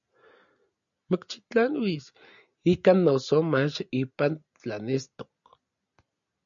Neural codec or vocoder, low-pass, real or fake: none; 7.2 kHz; real